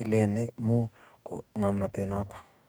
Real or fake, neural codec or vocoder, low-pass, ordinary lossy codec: fake; codec, 44.1 kHz, 2.6 kbps, DAC; none; none